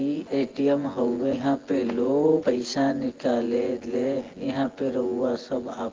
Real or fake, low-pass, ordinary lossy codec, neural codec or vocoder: fake; 7.2 kHz; Opus, 16 kbps; vocoder, 24 kHz, 100 mel bands, Vocos